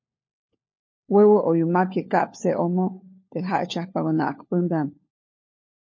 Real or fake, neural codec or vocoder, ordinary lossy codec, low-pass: fake; codec, 16 kHz, 4 kbps, FunCodec, trained on LibriTTS, 50 frames a second; MP3, 32 kbps; 7.2 kHz